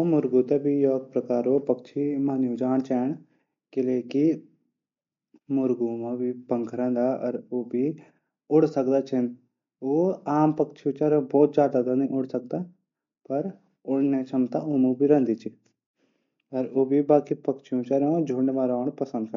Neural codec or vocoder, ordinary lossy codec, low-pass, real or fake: none; MP3, 48 kbps; 7.2 kHz; real